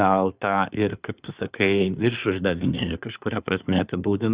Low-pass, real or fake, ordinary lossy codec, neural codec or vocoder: 3.6 kHz; fake; Opus, 64 kbps; codec, 24 kHz, 1 kbps, SNAC